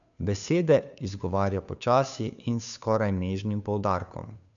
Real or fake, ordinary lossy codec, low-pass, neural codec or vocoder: fake; none; 7.2 kHz; codec, 16 kHz, 2 kbps, FunCodec, trained on Chinese and English, 25 frames a second